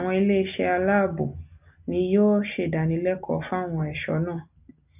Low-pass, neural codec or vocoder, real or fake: 3.6 kHz; none; real